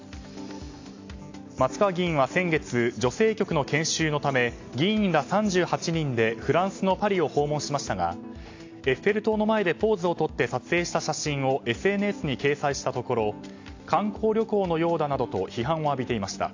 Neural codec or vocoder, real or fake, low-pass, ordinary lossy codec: none; real; 7.2 kHz; AAC, 48 kbps